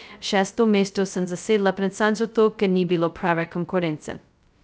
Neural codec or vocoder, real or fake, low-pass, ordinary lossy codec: codec, 16 kHz, 0.2 kbps, FocalCodec; fake; none; none